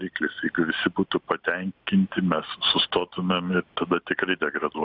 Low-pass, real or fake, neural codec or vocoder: 5.4 kHz; real; none